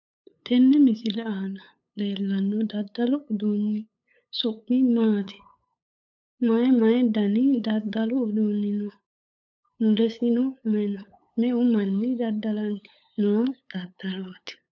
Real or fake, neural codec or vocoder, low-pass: fake; codec, 16 kHz, 8 kbps, FunCodec, trained on LibriTTS, 25 frames a second; 7.2 kHz